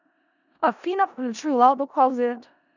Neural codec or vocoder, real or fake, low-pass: codec, 16 kHz in and 24 kHz out, 0.4 kbps, LongCat-Audio-Codec, four codebook decoder; fake; 7.2 kHz